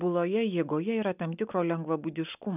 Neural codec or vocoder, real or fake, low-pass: none; real; 3.6 kHz